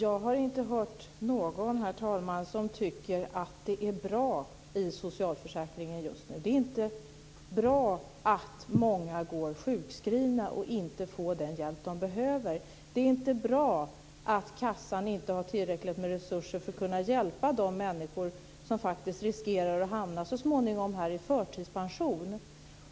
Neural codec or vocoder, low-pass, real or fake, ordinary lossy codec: none; none; real; none